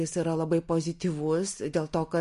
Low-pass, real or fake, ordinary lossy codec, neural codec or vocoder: 14.4 kHz; real; MP3, 48 kbps; none